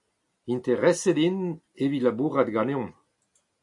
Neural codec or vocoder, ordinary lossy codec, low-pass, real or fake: none; MP3, 48 kbps; 10.8 kHz; real